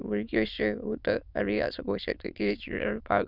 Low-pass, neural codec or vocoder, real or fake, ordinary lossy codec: 5.4 kHz; autoencoder, 22.05 kHz, a latent of 192 numbers a frame, VITS, trained on many speakers; fake; none